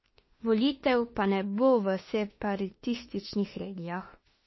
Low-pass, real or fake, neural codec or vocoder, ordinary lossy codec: 7.2 kHz; fake; autoencoder, 48 kHz, 32 numbers a frame, DAC-VAE, trained on Japanese speech; MP3, 24 kbps